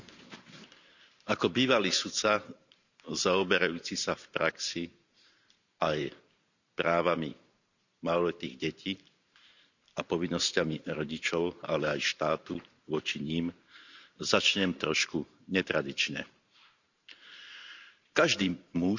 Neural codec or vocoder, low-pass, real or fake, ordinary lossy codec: vocoder, 44.1 kHz, 128 mel bands every 512 samples, BigVGAN v2; 7.2 kHz; fake; none